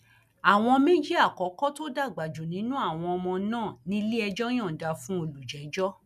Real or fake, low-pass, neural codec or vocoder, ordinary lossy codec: real; 14.4 kHz; none; none